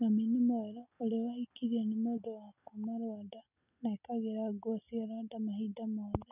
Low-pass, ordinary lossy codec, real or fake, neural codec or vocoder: 3.6 kHz; none; real; none